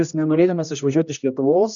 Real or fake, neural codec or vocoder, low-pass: fake; codec, 16 kHz, 1 kbps, X-Codec, HuBERT features, trained on general audio; 7.2 kHz